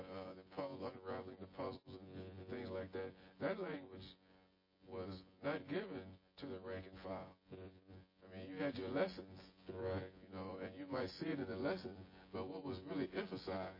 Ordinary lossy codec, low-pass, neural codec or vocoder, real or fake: MP3, 24 kbps; 5.4 kHz; vocoder, 24 kHz, 100 mel bands, Vocos; fake